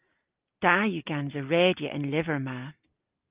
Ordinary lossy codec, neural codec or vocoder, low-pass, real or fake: Opus, 24 kbps; none; 3.6 kHz; real